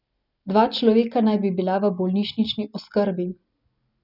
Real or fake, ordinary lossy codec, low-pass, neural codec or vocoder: real; none; 5.4 kHz; none